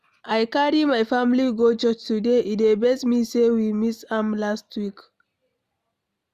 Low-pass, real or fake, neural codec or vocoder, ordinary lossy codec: 14.4 kHz; real; none; Opus, 64 kbps